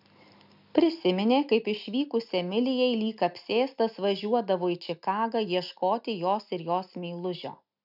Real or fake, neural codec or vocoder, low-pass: real; none; 5.4 kHz